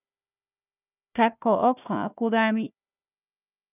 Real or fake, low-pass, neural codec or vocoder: fake; 3.6 kHz; codec, 16 kHz, 1 kbps, FunCodec, trained on Chinese and English, 50 frames a second